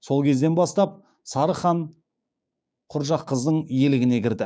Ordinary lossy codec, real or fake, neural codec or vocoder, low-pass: none; fake; codec, 16 kHz, 6 kbps, DAC; none